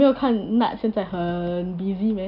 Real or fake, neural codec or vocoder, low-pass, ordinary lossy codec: real; none; 5.4 kHz; none